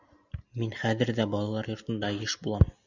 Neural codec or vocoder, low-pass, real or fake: none; 7.2 kHz; real